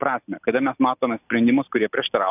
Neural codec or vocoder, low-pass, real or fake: none; 3.6 kHz; real